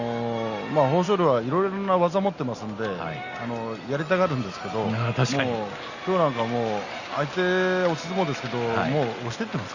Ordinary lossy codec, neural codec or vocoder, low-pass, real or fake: Opus, 64 kbps; none; 7.2 kHz; real